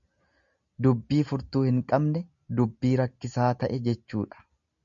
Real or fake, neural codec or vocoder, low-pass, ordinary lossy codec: real; none; 7.2 kHz; MP3, 96 kbps